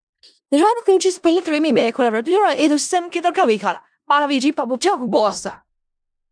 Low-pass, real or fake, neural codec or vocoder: 9.9 kHz; fake; codec, 16 kHz in and 24 kHz out, 0.4 kbps, LongCat-Audio-Codec, four codebook decoder